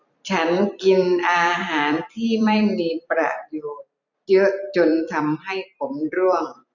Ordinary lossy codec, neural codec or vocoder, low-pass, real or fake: none; vocoder, 44.1 kHz, 128 mel bands every 256 samples, BigVGAN v2; 7.2 kHz; fake